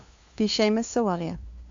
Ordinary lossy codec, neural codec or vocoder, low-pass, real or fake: AAC, 64 kbps; codec, 16 kHz, 2 kbps, FunCodec, trained on LibriTTS, 25 frames a second; 7.2 kHz; fake